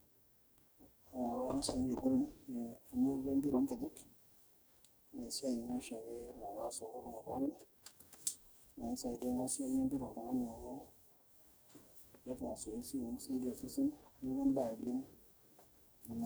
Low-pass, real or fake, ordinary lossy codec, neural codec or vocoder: none; fake; none; codec, 44.1 kHz, 2.6 kbps, DAC